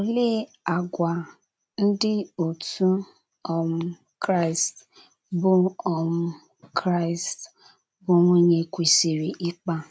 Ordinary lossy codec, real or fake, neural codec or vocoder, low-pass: none; real; none; none